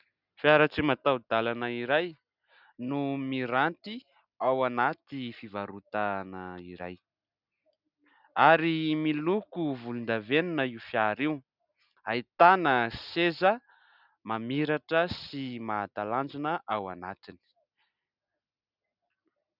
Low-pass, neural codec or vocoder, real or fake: 5.4 kHz; none; real